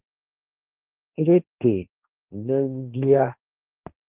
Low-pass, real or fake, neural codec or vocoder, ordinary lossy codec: 3.6 kHz; fake; codec, 16 kHz, 1.1 kbps, Voila-Tokenizer; Opus, 24 kbps